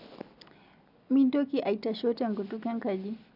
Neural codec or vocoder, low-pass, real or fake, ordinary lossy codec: none; 5.4 kHz; real; none